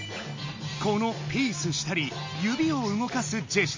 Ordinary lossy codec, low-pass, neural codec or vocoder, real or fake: MP3, 32 kbps; 7.2 kHz; none; real